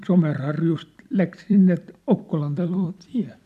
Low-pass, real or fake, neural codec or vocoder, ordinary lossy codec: 14.4 kHz; fake; vocoder, 44.1 kHz, 128 mel bands every 256 samples, BigVGAN v2; AAC, 96 kbps